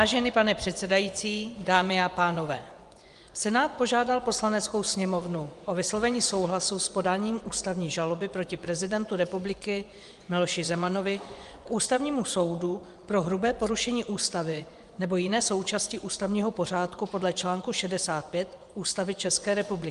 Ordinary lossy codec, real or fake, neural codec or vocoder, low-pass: Opus, 32 kbps; fake; vocoder, 24 kHz, 100 mel bands, Vocos; 10.8 kHz